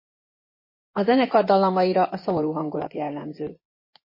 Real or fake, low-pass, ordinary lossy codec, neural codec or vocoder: fake; 5.4 kHz; MP3, 24 kbps; vocoder, 24 kHz, 100 mel bands, Vocos